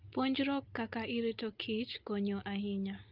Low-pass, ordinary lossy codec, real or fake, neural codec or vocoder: 5.4 kHz; Opus, 32 kbps; real; none